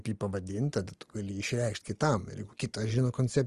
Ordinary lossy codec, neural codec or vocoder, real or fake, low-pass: Opus, 24 kbps; none; real; 10.8 kHz